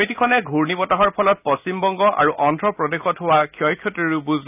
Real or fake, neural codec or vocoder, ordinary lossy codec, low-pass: real; none; none; 3.6 kHz